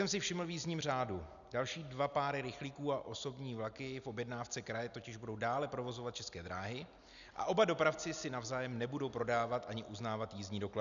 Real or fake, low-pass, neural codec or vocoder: real; 7.2 kHz; none